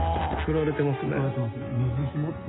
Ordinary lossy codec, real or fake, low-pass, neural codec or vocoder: AAC, 16 kbps; real; 7.2 kHz; none